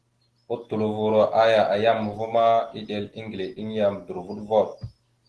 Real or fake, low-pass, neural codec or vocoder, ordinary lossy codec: real; 10.8 kHz; none; Opus, 16 kbps